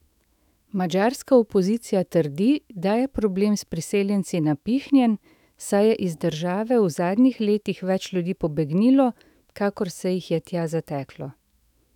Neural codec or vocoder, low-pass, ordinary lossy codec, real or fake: autoencoder, 48 kHz, 128 numbers a frame, DAC-VAE, trained on Japanese speech; 19.8 kHz; none; fake